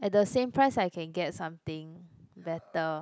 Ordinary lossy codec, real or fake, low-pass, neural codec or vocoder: none; real; none; none